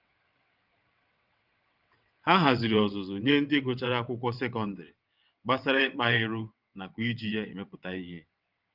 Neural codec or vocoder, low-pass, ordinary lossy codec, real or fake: vocoder, 22.05 kHz, 80 mel bands, WaveNeXt; 5.4 kHz; Opus, 24 kbps; fake